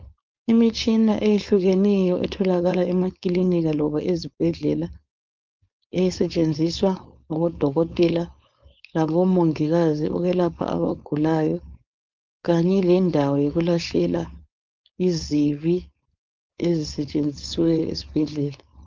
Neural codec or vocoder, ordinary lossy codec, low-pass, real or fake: codec, 16 kHz, 4.8 kbps, FACodec; Opus, 24 kbps; 7.2 kHz; fake